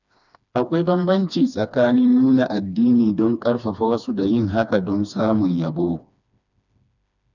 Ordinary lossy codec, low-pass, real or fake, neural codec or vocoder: none; 7.2 kHz; fake; codec, 16 kHz, 2 kbps, FreqCodec, smaller model